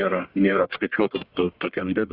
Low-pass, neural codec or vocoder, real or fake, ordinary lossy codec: 5.4 kHz; codec, 44.1 kHz, 1.7 kbps, Pupu-Codec; fake; Opus, 64 kbps